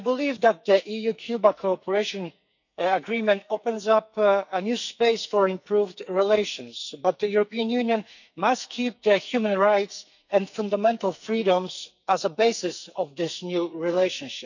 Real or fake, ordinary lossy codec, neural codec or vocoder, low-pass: fake; none; codec, 44.1 kHz, 2.6 kbps, SNAC; 7.2 kHz